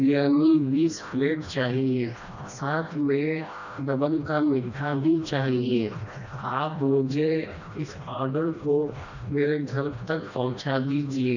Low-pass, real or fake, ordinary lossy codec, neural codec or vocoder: 7.2 kHz; fake; none; codec, 16 kHz, 1 kbps, FreqCodec, smaller model